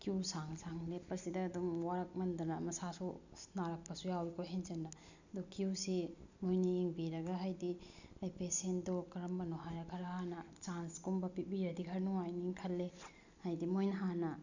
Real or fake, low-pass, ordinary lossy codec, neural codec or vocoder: real; 7.2 kHz; none; none